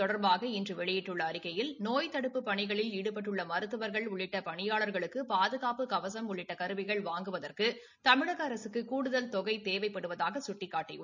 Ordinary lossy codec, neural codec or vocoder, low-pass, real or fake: none; none; 7.2 kHz; real